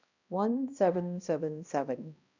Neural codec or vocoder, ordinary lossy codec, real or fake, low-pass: codec, 16 kHz, 1 kbps, X-Codec, HuBERT features, trained on balanced general audio; none; fake; 7.2 kHz